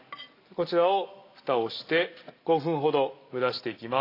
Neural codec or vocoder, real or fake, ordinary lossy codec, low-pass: none; real; AAC, 32 kbps; 5.4 kHz